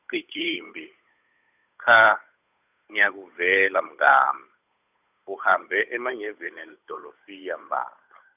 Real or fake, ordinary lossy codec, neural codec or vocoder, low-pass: fake; none; codec, 16 kHz, 8 kbps, FunCodec, trained on Chinese and English, 25 frames a second; 3.6 kHz